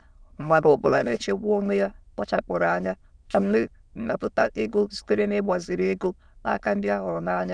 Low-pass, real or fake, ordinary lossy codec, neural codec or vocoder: 9.9 kHz; fake; Opus, 64 kbps; autoencoder, 22.05 kHz, a latent of 192 numbers a frame, VITS, trained on many speakers